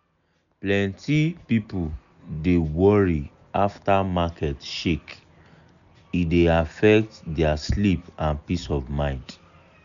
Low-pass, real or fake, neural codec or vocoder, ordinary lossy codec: 7.2 kHz; real; none; none